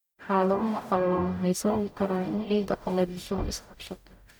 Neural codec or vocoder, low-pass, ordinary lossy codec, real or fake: codec, 44.1 kHz, 0.9 kbps, DAC; none; none; fake